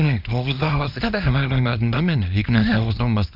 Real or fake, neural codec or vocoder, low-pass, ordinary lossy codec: fake; codec, 24 kHz, 0.9 kbps, WavTokenizer, small release; 5.4 kHz; none